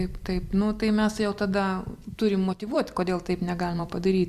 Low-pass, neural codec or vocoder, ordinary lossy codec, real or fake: 14.4 kHz; vocoder, 44.1 kHz, 128 mel bands every 256 samples, BigVGAN v2; Opus, 64 kbps; fake